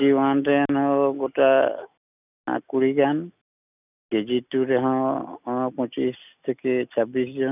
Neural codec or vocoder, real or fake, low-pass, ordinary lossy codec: none; real; 3.6 kHz; none